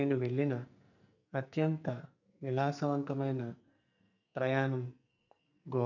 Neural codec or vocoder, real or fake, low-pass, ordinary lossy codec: codec, 44.1 kHz, 2.6 kbps, SNAC; fake; 7.2 kHz; none